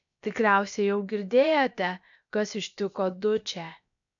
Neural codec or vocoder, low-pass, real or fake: codec, 16 kHz, about 1 kbps, DyCAST, with the encoder's durations; 7.2 kHz; fake